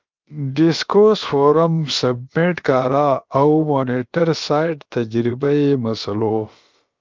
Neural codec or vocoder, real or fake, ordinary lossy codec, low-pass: codec, 16 kHz, about 1 kbps, DyCAST, with the encoder's durations; fake; Opus, 24 kbps; 7.2 kHz